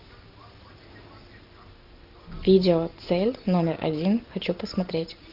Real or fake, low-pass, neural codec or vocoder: real; 5.4 kHz; none